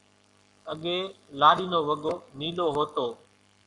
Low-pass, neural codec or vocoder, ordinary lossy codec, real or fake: 10.8 kHz; codec, 44.1 kHz, 7.8 kbps, Pupu-Codec; AAC, 64 kbps; fake